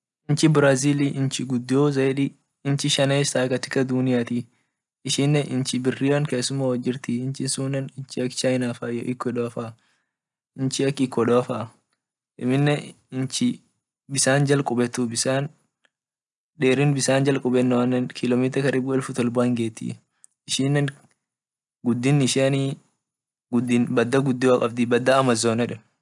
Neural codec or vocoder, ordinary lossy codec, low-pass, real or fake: none; none; 10.8 kHz; real